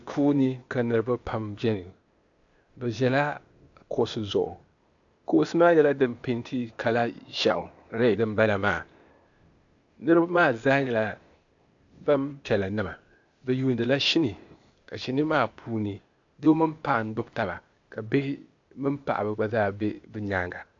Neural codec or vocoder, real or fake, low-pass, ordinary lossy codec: codec, 16 kHz, 0.8 kbps, ZipCodec; fake; 7.2 kHz; AAC, 64 kbps